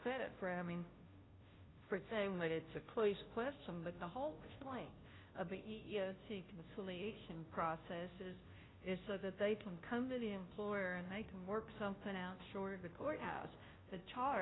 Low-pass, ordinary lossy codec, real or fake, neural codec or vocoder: 7.2 kHz; AAC, 16 kbps; fake; codec, 16 kHz, 0.5 kbps, FunCodec, trained on Chinese and English, 25 frames a second